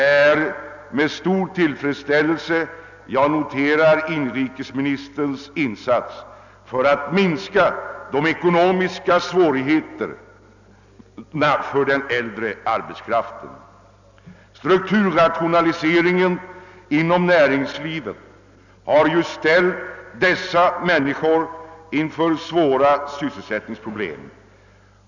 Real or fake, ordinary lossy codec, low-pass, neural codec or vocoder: real; none; 7.2 kHz; none